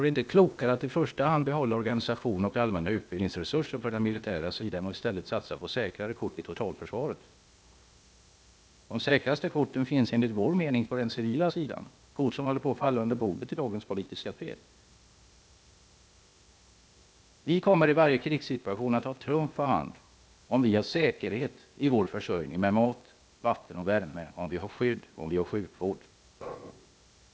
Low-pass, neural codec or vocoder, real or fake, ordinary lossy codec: none; codec, 16 kHz, 0.8 kbps, ZipCodec; fake; none